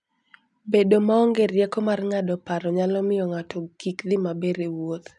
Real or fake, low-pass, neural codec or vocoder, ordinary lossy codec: real; 10.8 kHz; none; none